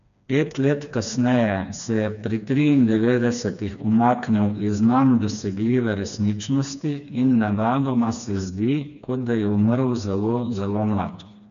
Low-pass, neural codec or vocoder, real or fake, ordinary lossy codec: 7.2 kHz; codec, 16 kHz, 2 kbps, FreqCodec, smaller model; fake; none